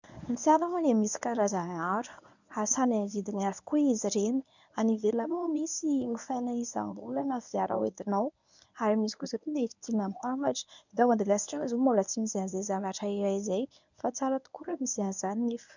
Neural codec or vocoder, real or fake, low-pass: codec, 24 kHz, 0.9 kbps, WavTokenizer, medium speech release version 1; fake; 7.2 kHz